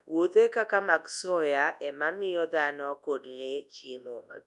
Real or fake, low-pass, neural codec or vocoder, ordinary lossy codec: fake; 10.8 kHz; codec, 24 kHz, 0.9 kbps, WavTokenizer, large speech release; none